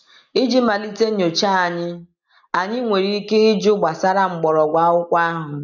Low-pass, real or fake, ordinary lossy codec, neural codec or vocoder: 7.2 kHz; real; none; none